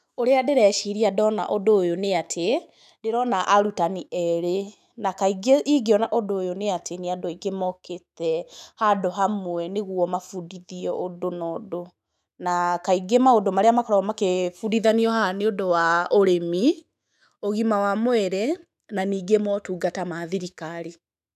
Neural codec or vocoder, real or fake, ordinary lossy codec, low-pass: autoencoder, 48 kHz, 128 numbers a frame, DAC-VAE, trained on Japanese speech; fake; none; 14.4 kHz